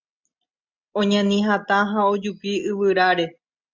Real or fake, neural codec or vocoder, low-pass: real; none; 7.2 kHz